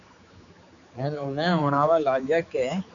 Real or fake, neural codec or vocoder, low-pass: fake; codec, 16 kHz, 4 kbps, X-Codec, HuBERT features, trained on general audio; 7.2 kHz